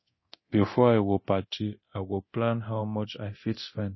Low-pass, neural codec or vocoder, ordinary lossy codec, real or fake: 7.2 kHz; codec, 24 kHz, 0.9 kbps, DualCodec; MP3, 24 kbps; fake